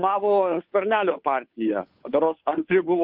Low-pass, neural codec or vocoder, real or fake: 5.4 kHz; codec, 16 kHz, 2 kbps, FunCodec, trained on Chinese and English, 25 frames a second; fake